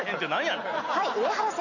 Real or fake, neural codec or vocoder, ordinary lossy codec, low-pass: real; none; none; 7.2 kHz